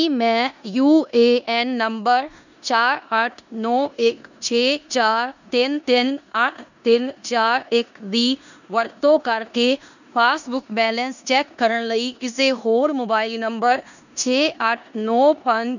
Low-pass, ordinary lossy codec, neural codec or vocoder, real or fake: 7.2 kHz; none; codec, 16 kHz in and 24 kHz out, 0.9 kbps, LongCat-Audio-Codec, four codebook decoder; fake